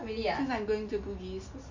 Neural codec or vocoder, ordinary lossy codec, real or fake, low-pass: none; MP3, 48 kbps; real; 7.2 kHz